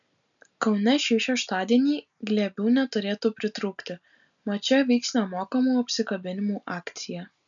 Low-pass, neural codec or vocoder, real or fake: 7.2 kHz; none; real